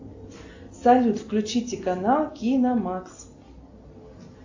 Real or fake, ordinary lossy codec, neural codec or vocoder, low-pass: real; AAC, 48 kbps; none; 7.2 kHz